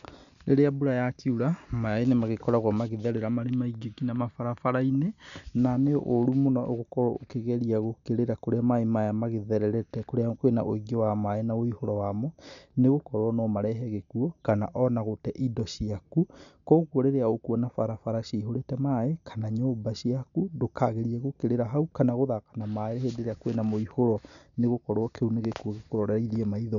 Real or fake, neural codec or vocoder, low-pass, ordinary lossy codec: real; none; 7.2 kHz; none